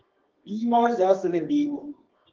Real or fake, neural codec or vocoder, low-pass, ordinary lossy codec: fake; codec, 24 kHz, 0.9 kbps, WavTokenizer, medium music audio release; 7.2 kHz; Opus, 16 kbps